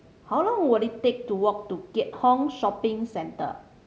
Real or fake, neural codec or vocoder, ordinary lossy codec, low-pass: real; none; none; none